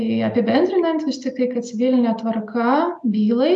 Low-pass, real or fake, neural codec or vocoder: 9.9 kHz; real; none